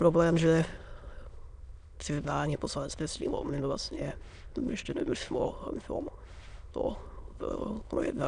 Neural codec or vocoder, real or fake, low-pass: autoencoder, 22.05 kHz, a latent of 192 numbers a frame, VITS, trained on many speakers; fake; 9.9 kHz